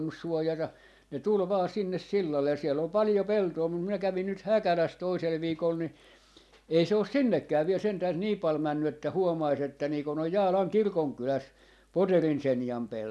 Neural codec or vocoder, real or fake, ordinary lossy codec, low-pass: none; real; none; none